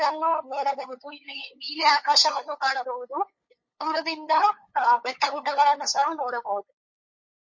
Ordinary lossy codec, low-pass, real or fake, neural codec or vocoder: MP3, 32 kbps; 7.2 kHz; fake; codec, 16 kHz, 8 kbps, FunCodec, trained on LibriTTS, 25 frames a second